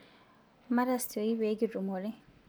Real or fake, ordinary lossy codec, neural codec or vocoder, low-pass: real; none; none; none